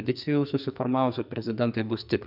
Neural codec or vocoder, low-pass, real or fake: codec, 44.1 kHz, 2.6 kbps, SNAC; 5.4 kHz; fake